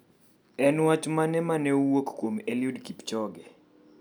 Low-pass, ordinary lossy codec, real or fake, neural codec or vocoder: none; none; real; none